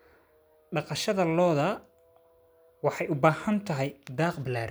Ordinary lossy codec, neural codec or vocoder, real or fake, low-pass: none; none; real; none